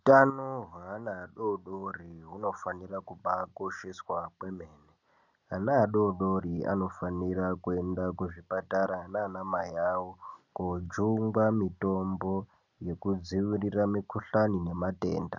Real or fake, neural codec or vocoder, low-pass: real; none; 7.2 kHz